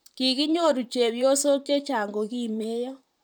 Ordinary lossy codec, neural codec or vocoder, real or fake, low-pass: none; vocoder, 44.1 kHz, 128 mel bands, Pupu-Vocoder; fake; none